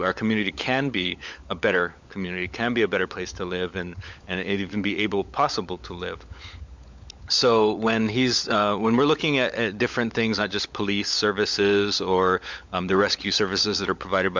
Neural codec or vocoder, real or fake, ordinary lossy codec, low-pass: codec, 16 kHz, 16 kbps, FunCodec, trained on LibriTTS, 50 frames a second; fake; MP3, 64 kbps; 7.2 kHz